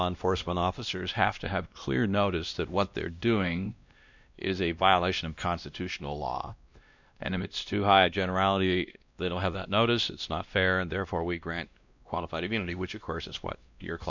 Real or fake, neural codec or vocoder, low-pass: fake; codec, 16 kHz, 1 kbps, X-Codec, WavLM features, trained on Multilingual LibriSpeech; 7.2 kHz